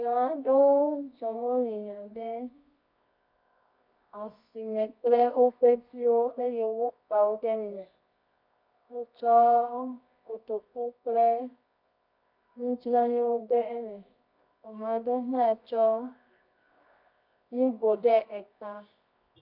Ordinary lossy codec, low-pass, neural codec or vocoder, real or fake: MP3, 48 kbps; 5.4 kHz; codec, 24 kHz, 0.9 kbps, WavTokenizer, medium music audio release; fake